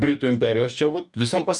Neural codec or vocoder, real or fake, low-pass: codec, 44.1 kHz, 2.6 kbps, DAC; fake; 10.8 kHz